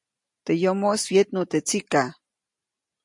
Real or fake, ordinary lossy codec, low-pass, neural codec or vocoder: real; MP3, 48 kbps; 10.8 kHz; none